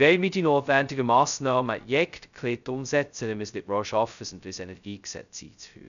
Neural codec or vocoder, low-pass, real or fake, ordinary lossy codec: codec, 16 kHz, 0.2 kbps, FocalCodec; 7.2 kHz; fake; none